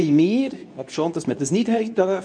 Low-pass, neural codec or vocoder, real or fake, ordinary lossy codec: 9.9 kHz; codec, 24 kHz, 0.9 kbps, WavTokenizer, medium speech release version 2; fake; none